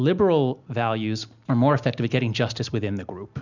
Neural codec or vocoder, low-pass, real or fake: none; 7.2 kHz; real